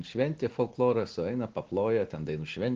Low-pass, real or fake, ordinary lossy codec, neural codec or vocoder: 7.2 kHz; real; Opus, 16 kbps; none